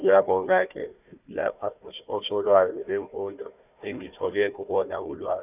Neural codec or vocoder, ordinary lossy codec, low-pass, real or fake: codec, 16 kHz, 1 kbps, FunCodec, trained on Chinese and English, 50 frames a second; none; 3.6 kHz; fake